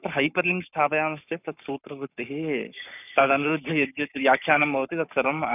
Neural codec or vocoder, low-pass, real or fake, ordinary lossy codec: codec, 44.1 kHz, 7.8 kbps, Pupu-Codec; 3.6 kHz; fake; none